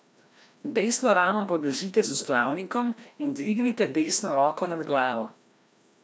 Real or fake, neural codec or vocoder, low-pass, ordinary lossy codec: fake; codec, 16 kHz, 1 kbps, FreqCodec, larger model; none; none